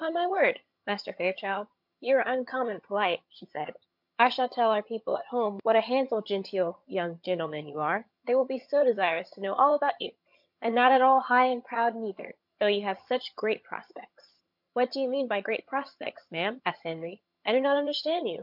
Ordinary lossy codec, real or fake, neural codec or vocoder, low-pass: MP3, 48 kbps; fake; vocoder, 22.05 kHz, 80 mel bands, HiFi-GAN; 5.4 kHz